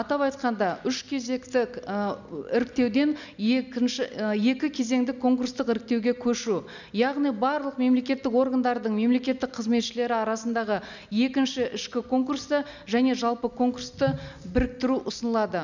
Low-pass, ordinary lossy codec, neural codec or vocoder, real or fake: 7.2 kHz; none; none; real